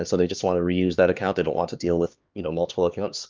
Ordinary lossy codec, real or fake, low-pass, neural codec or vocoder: Opus, 24 kbps; fake; 7.2 kHz; codec, 16 kHz, 2 kbps, X-Codec, HuBERT features, trained on LibriSpeech